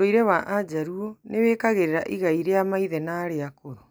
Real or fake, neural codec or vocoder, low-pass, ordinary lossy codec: real; none; none; none